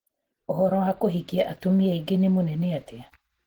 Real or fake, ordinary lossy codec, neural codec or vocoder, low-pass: real; Opus, 16 kbps; none; 19.8 kHz